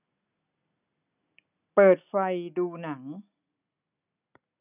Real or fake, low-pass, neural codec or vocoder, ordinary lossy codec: real; 3.6 kHz; none; none